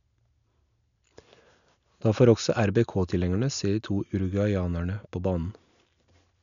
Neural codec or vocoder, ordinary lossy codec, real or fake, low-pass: none; none; real; 7.2 kHz